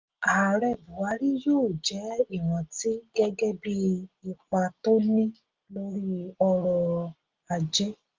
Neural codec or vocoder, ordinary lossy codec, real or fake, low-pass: none; Opus, 32 kbps; real; 7.2 kHz